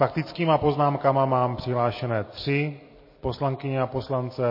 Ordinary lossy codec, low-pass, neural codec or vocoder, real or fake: MP3, 24 kbps; 5.4 kHz; none; real